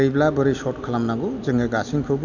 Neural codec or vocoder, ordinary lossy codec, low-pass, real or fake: none; none; 7.2 kHz; real